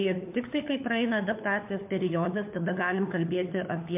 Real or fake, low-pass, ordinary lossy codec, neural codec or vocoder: fake; 3.6 kHz; MP3, 32 kbps; codec, 16 kHz, 4 kbps, FunCodec, trained on LibriTTS, 50 frames a second